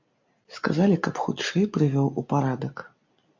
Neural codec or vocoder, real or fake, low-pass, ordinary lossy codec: none; real; 7.2 kHz; MP3, 48 kbps